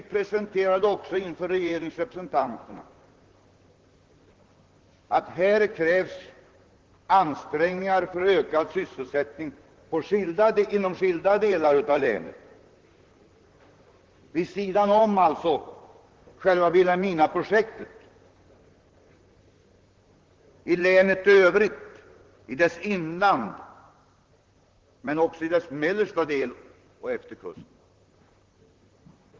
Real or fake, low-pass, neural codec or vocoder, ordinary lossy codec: fake; 7.2 kHz; vocoder, 44.1 kHz, 128 mel bands, Pupu-Vocoder; Opus, 16 kbps